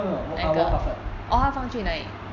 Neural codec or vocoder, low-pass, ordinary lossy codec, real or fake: none; 7.2 kHz; AAC, 48 kbps; real